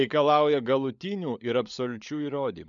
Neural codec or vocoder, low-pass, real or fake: codec, 16 kHz, 16 kbps, FunCodec, trained on LibriTTS, 50 frames a second; 7.2 kHz; fake